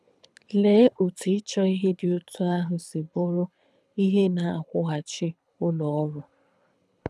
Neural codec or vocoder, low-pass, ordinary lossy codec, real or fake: codec, 24 kHz, 6 kbps, HILCodec; none; none; fake